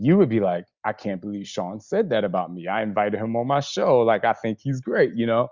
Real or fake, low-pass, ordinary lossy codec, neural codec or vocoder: real; 7.2 kHz; Opus, 64 kbps; none